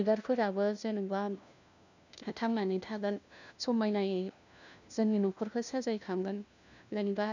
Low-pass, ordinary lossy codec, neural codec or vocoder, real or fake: 7.2 kHz; none; codec, 16 kHz, 1 kbps, FunCodec, trained on LibriTTS, 50 frames a second; fake